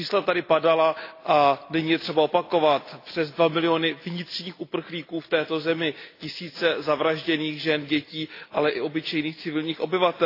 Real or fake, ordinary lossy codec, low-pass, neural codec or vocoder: real; AAC, 32 kbps; 5.4 kHz; none